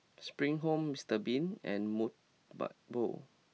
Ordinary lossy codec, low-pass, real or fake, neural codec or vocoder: none; none; real; none